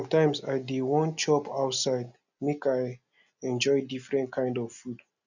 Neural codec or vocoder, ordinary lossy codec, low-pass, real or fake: none; none; 7.2 kHz; real